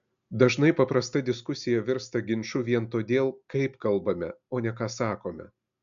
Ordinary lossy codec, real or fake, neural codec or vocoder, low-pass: MP3, 64 kbps; real; none; 7.2 kHz